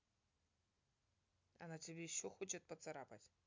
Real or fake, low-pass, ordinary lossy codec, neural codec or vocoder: real; 7.2 kHz; MP3, 64 kbps; none